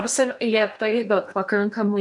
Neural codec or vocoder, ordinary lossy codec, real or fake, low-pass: codec, 16 kHz in and 24 kHz out, 0.8 kbps, FocalCodec, streaming, 65536 codes; MP3, 96 kbps; fake; 10.8 kHz